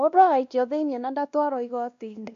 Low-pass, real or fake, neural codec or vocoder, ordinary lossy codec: 7.2 kHz; fake; codec, 16 kHz, 1 kbps, X-Codec, WavLM features, trained on Multilingual LibriSpeech; MP3, 64 kbps